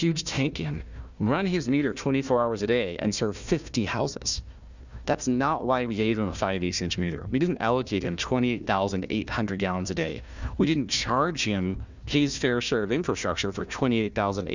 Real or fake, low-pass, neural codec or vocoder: fake; 7.2 kHz; codec, 16 kHz, 1 kbps, FunCodec, trained on Chinese and English, 50 frames a second